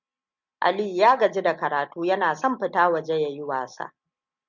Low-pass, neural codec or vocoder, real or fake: 7.2 kHz; none; real